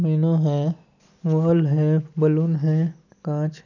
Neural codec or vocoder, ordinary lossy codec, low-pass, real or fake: none; none; 7.2 kHz; real